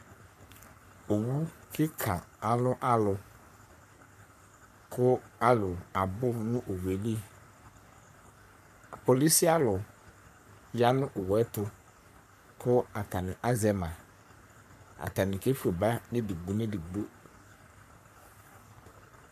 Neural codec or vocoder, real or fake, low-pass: codec, 44.1 kHz, 3.4 kbps, Pupu-Codec; fake; 14.4 kHz